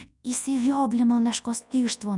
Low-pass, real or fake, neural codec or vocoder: 10.8 kHz; fake; codec, 24 kHz, 0.9 kbps, WavTokenizer, large speech release